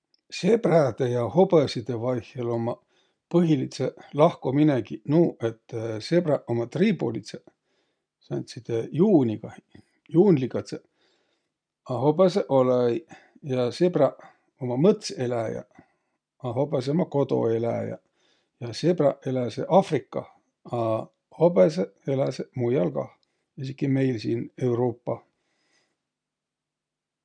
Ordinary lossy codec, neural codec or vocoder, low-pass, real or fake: none; none; 9.9 kHz; real